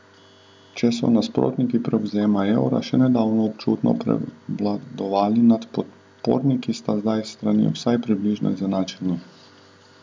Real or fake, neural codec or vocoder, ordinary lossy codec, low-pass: real; none; none; none